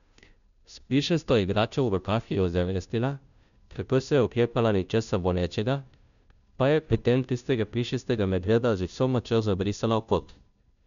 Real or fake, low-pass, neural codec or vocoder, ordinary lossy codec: fake; 7.2 kHz; codec, 16 kHz, 0.5 kbps, FunCodec, trained on Chinese and English, 25 frames a second; none